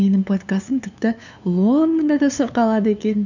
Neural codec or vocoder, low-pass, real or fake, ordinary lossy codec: codec, 16 kHz, 2 kbps, FunCodec, trained on LibriTTS, 25 frames a second; 7.2 kHz; fake; none